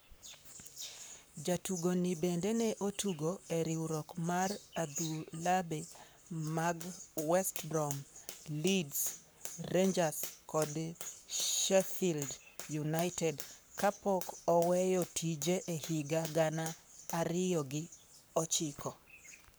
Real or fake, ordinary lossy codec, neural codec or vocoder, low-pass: fake; none; codec, 44.1 kHz, 7.8 kbps, Pupu-Codec; none